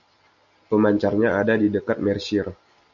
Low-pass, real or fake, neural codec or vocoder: 7.2 kHz; real; none